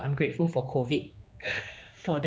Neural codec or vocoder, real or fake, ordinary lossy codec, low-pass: codec, 16 kHz, 2 kbps, X-Codec, HuBERT features, trained on general audio; fake; none; none